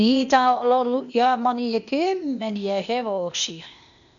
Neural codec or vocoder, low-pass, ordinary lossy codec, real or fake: codec, 16 kHz, 0.8 kbps, ZipCodec; 7.2 kHz; none; fake